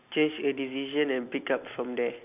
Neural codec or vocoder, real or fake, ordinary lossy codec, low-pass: none; real; none; 3.6 kHz